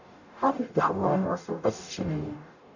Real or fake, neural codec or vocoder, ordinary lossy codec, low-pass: fake; codec, 44.1 kHz, 0.9 kbps, DAC; none; 7.2 kHz